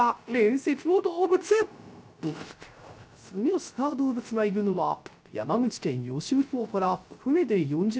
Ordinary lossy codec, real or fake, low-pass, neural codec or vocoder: none; fake; none; codec, 16 kHz, 0.3 kbps, FocalCodec